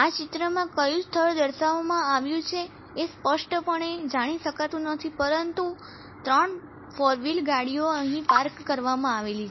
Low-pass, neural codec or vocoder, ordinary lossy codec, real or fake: 7.2 kHz; none; MP3, 24 kbps; real